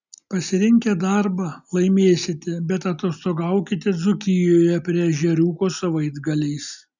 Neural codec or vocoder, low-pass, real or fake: none; 7.2 kHz; real